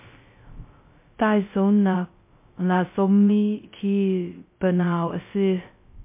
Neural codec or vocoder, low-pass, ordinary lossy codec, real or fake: codec, 16 kHz, 0.2 kbps, FocalCodec; 3.6 kHz; MP3, 24 kbps; fake